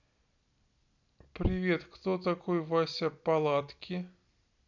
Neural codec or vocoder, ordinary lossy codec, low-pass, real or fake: none; none; 7.2 kHz; real